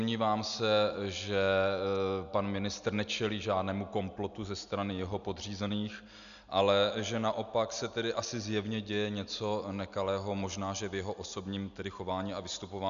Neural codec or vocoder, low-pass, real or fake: none; 7.2 kHz; real